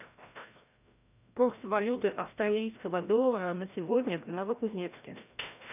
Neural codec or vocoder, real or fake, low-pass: codec, 16 kHz, 1 kbps, FreqCodec, larger model; fake; 3.6 kHz